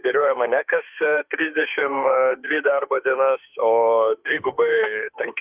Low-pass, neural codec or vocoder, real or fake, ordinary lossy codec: 3.6 kHz; autoencoder, 48 kHz, 32 numbers a frame, DAC-VAE, trained on Japanese speech; fake; Opus, 32 kbps